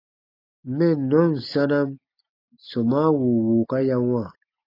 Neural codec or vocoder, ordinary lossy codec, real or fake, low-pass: none; AAC, 32 kbps; real; 5.4 kHz